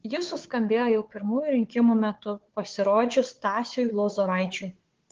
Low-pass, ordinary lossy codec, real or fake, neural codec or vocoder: 7.2 kHz; Opus, 16 kbps; fake; codec, 16 kHz, 4 kbps, FunCodec, trained on Chinese and English, 50 frames a second